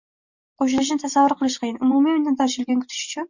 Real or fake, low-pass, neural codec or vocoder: real; 7.2 kHz; none